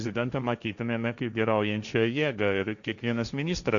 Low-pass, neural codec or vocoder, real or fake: 7.2 kHz; codec, 16 kHz, 1.1 kbps, Voila-Tokenizer; fake